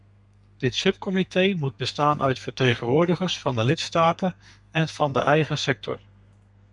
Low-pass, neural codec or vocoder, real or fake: 10.8 kHz; codec, 32 kHz, 1.9 kbps, SNAC; fake